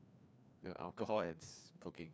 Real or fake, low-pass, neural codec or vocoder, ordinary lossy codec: fake; none; codec, 16 kHz, 2 kbps, FreqCodec, larger model; none